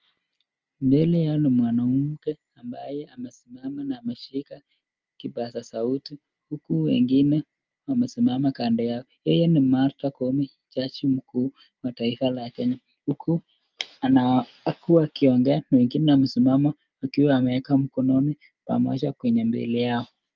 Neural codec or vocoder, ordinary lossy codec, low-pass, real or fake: none; Opus, 24 kbps; 7.2 kHz; real